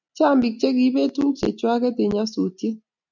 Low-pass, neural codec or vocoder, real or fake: 7.2 kHz; none; real